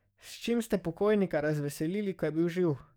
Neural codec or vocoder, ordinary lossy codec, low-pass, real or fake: codec, 44.1 kHz, 7.8 kbps, DAC; none; none; fake